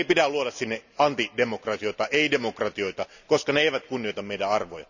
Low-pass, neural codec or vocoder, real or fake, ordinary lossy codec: 7.2 kHz; none; real; none